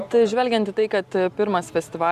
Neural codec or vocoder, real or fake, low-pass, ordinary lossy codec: none; real; 14.4 kHz; Opus, 64 kbps